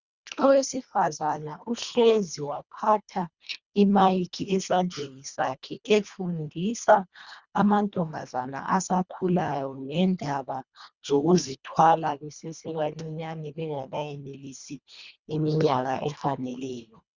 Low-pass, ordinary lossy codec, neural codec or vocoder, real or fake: 7.2 kHz; Opus, 64 kbps; codec, 24 kHz, 1.5 kbps, HILCodec; fake